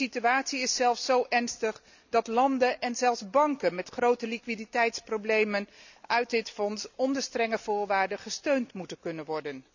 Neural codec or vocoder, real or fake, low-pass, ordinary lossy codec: none; real; 7.2 kHz; none